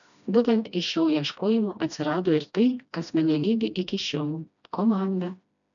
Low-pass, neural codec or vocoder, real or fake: 7.2 kHz; codec, 16 kHz, 1 kbps, FreqCodec, smaller model; fake